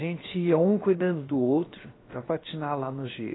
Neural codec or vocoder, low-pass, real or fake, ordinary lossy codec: codec, 16 kHz, 0.7 kbps, FocalCodec; 7.2 kHz; fake; AAC, 16 kbps